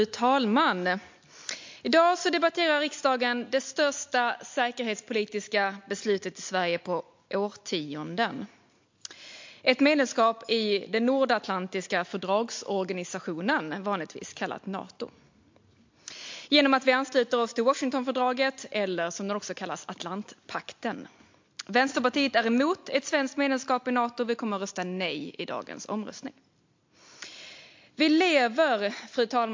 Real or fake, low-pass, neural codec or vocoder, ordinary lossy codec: real; 7.2 kHz; none; MP3, 48 kbps